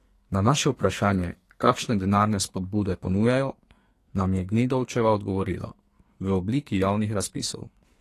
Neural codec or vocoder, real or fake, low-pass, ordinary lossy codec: codec, 44.1 kHz, 2.6 kbps, SNAC; fake; 14.4 kHz; AAC, 48 kbps